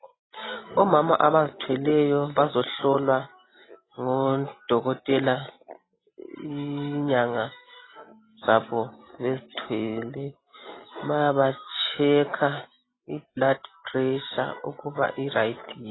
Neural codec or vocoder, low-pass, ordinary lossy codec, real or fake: none; 7.2 kHz; AAC, 16 kbps; real